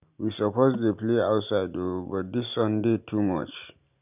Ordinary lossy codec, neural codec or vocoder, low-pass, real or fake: none; none; 3.6 kHz; real